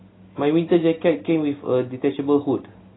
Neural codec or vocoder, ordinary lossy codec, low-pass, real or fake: none; AAC, 16 kbps; 7.2 kHz; real